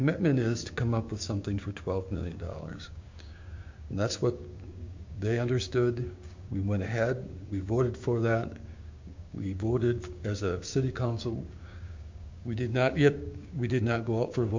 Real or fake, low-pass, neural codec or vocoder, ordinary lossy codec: fake; 7.2 kHz; codec, 16 kHz, 6 kbps, DAC; MP3, 48 kbps